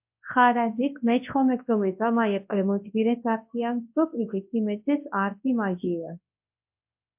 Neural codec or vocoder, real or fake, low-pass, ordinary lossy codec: codec, 24 kHz, 0.9 kbps, WavTokenizer, large speech release; fake; 3.6 kHz; MP3, 32 kbps